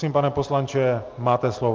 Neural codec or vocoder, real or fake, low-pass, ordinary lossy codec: none; real; 7.2 kHz; Opus, 32 kbps